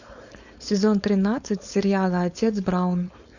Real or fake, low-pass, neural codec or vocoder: fake; 7.2 kHz; codec, 16 kHz, 4.8 kbps, FACodec